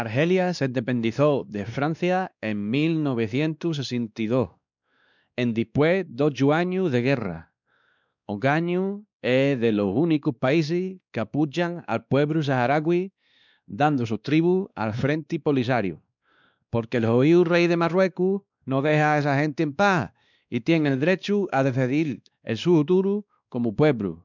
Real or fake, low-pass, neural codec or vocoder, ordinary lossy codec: fake; 7.2 kHz; codec, 16 kHz, 2 kbps, X-Codec, WavLM features, trained on Multilingual LibriSpeech; none